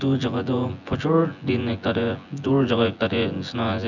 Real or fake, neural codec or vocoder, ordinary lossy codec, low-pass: fake; vocoder, 24 kHz, 100 mel bands, Vocos; none; 7.2 kHz